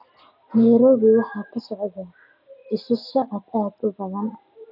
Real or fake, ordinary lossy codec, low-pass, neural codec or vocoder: real; none; 5.4 kHz; none